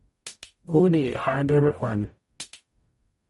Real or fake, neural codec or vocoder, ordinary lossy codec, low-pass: fake; codec, 44.1 kHz, 0.9 kbps, DAC; MP3, 48 kbps; 19.8 kHz